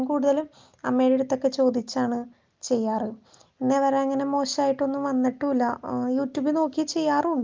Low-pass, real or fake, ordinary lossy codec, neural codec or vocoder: 7.2 kHz; real; Opus, 24 kbps; none